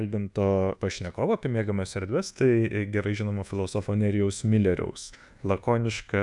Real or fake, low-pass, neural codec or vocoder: fake; 10.8 kHz; codec, 24 kHz, 1.2 kbps, DualCodec